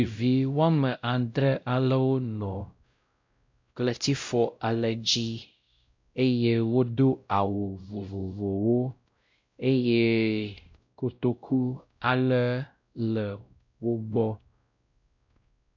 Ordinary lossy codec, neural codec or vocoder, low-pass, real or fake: MP3, 64 kbps; codec, 16 kHz, 0.5 kbps, X-Codec, WavLM features, trained on Multilingual LibriSpeech; 7.2 kHz; fake